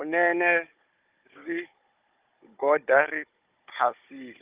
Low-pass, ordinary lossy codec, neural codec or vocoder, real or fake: 3.6 kHz; Opus, 24 kbps; codec, 16 kHz, 16 kbps, FunCodec, trained on Chinese and English, 50 frames a second; fake